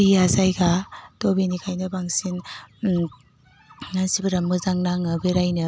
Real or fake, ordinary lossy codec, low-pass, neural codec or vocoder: real; none; none; none